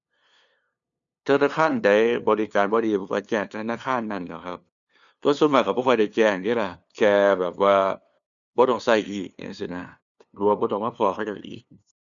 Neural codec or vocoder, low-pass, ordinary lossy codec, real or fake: codec, 16 kHz, 2 kbps, FunCodec, trained on LibriTTS, 25 frames a second; 7.2 kHz; none; fake